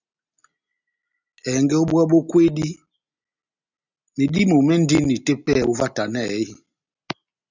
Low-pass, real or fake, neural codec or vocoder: 7.2 kHz; real; none